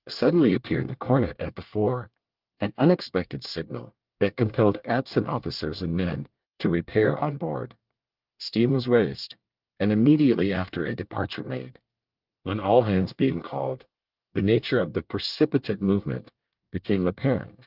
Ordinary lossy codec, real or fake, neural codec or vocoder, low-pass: Opus, 24 kbps; fake; codec, 24 kHz, 1 kbps, SNAC; 5.4 kHz